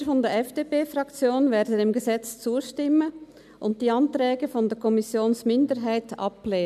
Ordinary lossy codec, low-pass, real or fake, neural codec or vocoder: none; 14.4 kHz; real; none